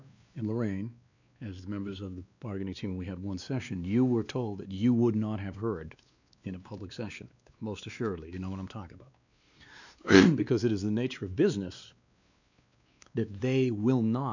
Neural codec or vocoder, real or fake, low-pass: codec, 16 kHz, 2 kbps, X-Codec, WavLM features, trained on Multilingual LibriSpeech; fake; 7.2 kHz